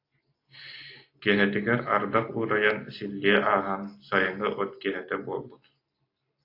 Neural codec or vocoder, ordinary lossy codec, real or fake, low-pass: none; AAC, 32 kbps; real; 5.4 kHz